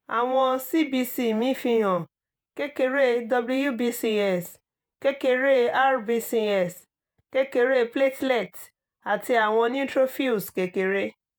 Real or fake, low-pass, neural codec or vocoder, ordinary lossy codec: fake; none; vocoder, 48 kHz, 128 mel bands, Vocos; none